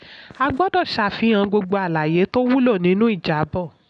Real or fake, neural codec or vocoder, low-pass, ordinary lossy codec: real; none; 10.8 kHz; none